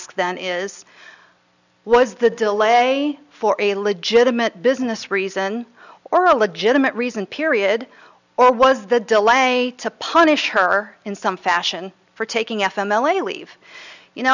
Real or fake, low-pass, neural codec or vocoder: real; 7.2 kHz; none